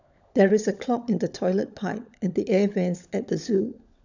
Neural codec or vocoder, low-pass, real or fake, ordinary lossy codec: codec, 16 kHz, 16 kbps, FunCodec, trained on LibriTTS, 50 frames a second; 7.2 kHz; fake; none